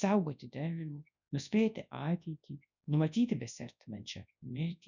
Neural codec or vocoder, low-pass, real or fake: codec, 24 kHz, 0.9 kbps, WavTokenizer, large speech release; 7.2 kHz; fake